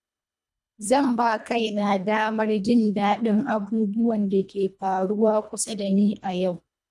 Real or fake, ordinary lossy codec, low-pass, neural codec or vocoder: fake; none; none; codec, 24 kHz, 1.5 kbps, HILCodec